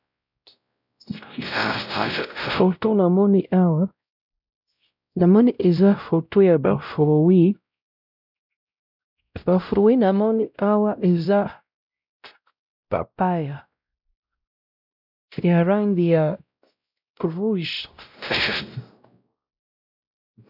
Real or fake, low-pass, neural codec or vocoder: fake; 5.4 kHz; codec, 16 kHz, 0.5 kbps, X-Codec, WavLM features, trained on Multilingual LibriSpeech